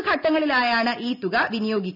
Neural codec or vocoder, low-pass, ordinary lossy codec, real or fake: none; 5.4 kHz; none; real